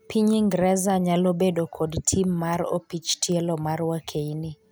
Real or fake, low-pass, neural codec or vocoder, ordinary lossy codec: real; none; none; none